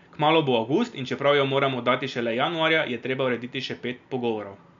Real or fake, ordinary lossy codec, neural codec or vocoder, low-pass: real; MP3, 64 kbps; none; 7.2 kHz